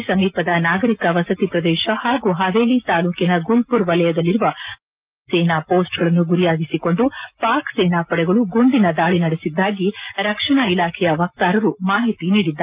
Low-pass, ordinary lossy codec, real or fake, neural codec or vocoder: 3.6 kHz; Opus, 24 kbps; real; none